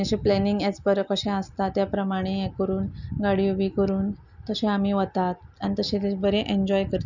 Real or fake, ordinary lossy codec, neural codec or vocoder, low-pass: real; none; none; 7.2 kHz